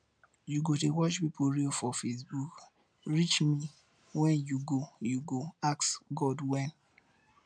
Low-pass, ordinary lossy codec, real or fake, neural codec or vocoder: 9.9 kHz; none; real; none